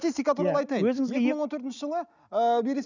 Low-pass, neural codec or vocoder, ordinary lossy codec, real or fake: 7.2 kHz; none; none; real